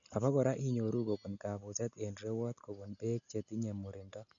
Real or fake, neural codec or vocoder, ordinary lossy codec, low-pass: real; none; none; 7.2 kHz